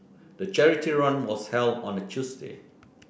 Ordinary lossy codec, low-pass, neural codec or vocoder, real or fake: none; none; none; real